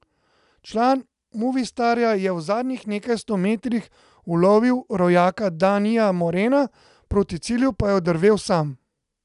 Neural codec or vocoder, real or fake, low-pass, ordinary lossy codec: none; real; 10.8 kHz; none